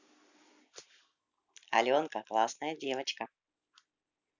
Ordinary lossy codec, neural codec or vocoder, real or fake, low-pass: none; none; real; 7.2 kHz